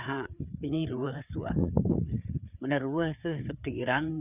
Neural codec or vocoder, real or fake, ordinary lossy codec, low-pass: codec, 16 kHz, 4 kbps, FreqCodec, larger model; fake; none; 3.6 kHz